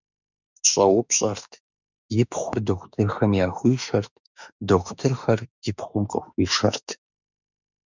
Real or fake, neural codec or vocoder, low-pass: fake; autoencoder, 48 kHz, 32 numbers a frame, DAC-VAE, trained on Japanese speech; 7.2 kHz